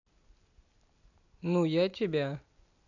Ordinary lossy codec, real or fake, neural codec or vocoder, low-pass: none; real; none; 7.2 kHz